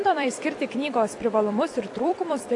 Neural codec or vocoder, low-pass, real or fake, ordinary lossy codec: vocoder, 44.1 kHz, 128 mel bands every 256 samples, BigVGAN v2; 10.8 kHz; fake; AAC, 64 kbps